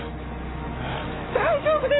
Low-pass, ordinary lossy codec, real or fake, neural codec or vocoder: 7.2 kHz; AAC, 16 kbps; real; none